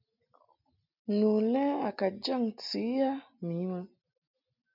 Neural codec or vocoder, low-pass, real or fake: none; 5.4 kHz; real